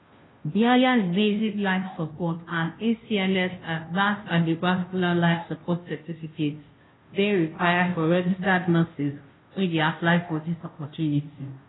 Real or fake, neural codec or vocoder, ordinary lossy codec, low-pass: fake; codec, 16 kHz, 0.5 kbps, FunCodec, trained on Chinese and English, 25 frames a second; AAC, 16 kbps; 7.2 kHz